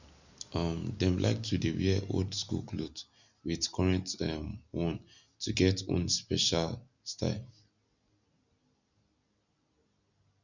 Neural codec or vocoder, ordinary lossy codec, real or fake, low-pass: none; none; real; 7.2 kHz